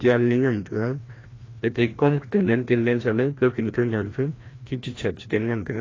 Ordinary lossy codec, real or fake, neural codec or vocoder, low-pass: AAC, 32 kbps; fake; codec, 16 kHz, 1 kbps, FreqCodec, larger model; 7.2 kHz